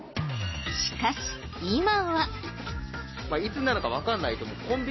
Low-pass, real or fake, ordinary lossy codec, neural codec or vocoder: 7.2 kHz; real; MP3, 24 kbps; none